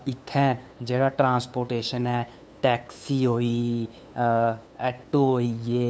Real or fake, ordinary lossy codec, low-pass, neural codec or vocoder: fake; none; none; codec, 16 kHz, 2 kbps, FunCodec, trained on LibriTTS, 25 frames a second